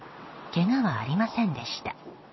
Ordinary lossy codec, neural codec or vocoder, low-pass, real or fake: MP3, 24 kbps; vocoder, 22.05 kHz, 80 mel bands, WaveNeXt; 7.2 kHz; fake